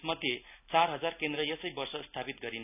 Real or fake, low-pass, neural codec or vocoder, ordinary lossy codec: real; 3.6 kHz; none; none